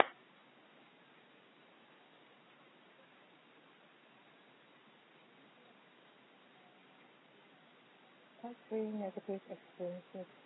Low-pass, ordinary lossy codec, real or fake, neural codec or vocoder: 7.2 kHz; AAC, 16 kbps; real; none